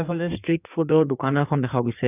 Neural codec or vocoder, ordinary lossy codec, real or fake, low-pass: codec, 16 kHz in and 24 kHz out, 1.1 kbps, FireRedTTS-2 codec; none; fake; 3.6 kHz